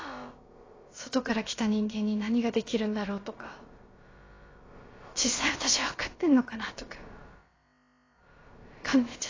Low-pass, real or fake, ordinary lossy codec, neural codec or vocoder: 7.2 kHz; fake; AAC, 32 kbps; codec, 16 kHz, about 1 kbps, DyCAST, with the encoder's durations